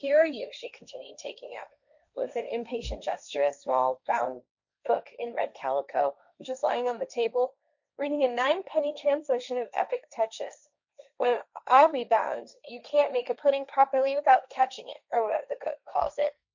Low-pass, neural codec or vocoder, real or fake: 7.2 kHz; codec, 16 kHz, 1.1 kbps, Voila-Tokenizer; fake